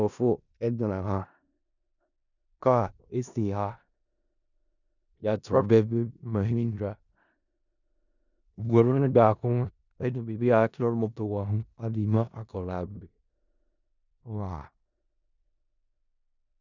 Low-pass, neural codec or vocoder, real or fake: 7.2 kHz; codec, 16 kHz in and 24 kHz out, 0.4 kbps, LongCat-Audio-Codec, four codebook decoder; fake